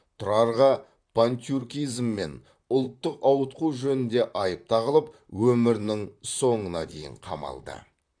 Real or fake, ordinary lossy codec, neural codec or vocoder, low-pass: fake; AAC, 64 kbps; vocoder, 44.1 kHz, 128 mel bands, Pupu-Vocoder; 9.9 kHz